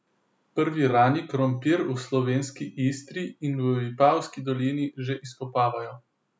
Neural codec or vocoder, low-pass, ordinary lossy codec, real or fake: none; none; none; real